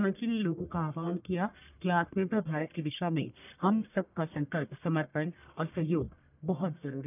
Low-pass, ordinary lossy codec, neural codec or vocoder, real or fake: 3.6 kHz; none; codec, 44.1 kHz, 1.7 kbps, Pupu-Codec; fake